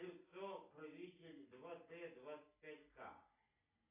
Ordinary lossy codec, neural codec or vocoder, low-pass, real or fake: AAC, 24 kbps; none; 3.6 kHz; real